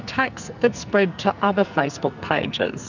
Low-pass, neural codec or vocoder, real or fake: 7.2 kHz; codec, 44.1 kHz, 2.6 kbps, SNAC; fake